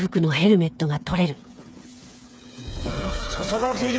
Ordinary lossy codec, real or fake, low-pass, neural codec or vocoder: none; fake; none; codec, 16 kHz, 8 kbps, FreqCodec, smaller model